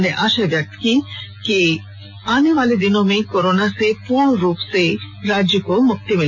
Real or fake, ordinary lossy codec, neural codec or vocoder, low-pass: real; MP3, 64 kbps; none; 7.2 kHz